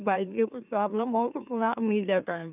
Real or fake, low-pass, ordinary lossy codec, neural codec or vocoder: fake; 3.6 kHz; none; autoencoder, 44.1 kHz, a latent of 192 numbers a frame, MeloTTS